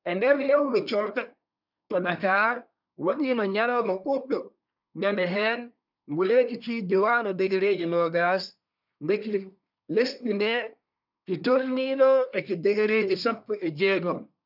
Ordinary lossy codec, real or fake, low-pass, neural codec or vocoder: none; fake; 5.4 kHz; codec, 24 kHz, 1 kbps, SNAC